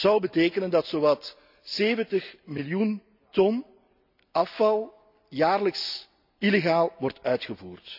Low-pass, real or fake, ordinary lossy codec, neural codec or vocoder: 5.4 kHz; real; none; none